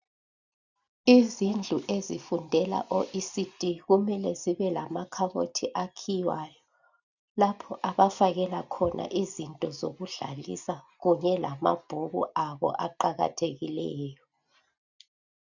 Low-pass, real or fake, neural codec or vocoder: 7.2 kHz; real; none